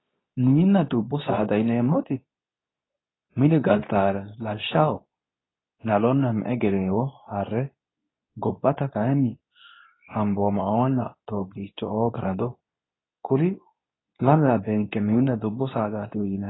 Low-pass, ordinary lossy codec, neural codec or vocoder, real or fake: 7.2 kHz; AAC, 16 kbps; codec, 24 kHz, 0.9 kbps, WavTokenizer, medium speech release version 2; fake